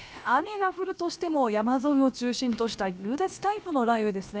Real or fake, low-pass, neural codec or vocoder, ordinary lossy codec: fake; none; codec, 16 kHz, about 1 kbps, DyCAST, with the encoder's durations; none